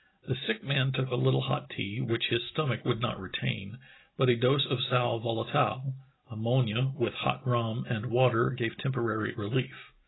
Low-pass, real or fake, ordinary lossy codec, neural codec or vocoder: 7.2 kHz; real; AAC, 16 kbps; none